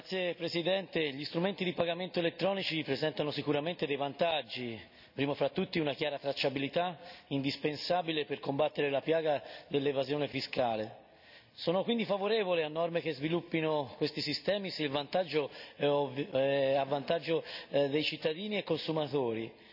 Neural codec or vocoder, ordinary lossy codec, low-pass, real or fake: none; none; 5.4 kHz; real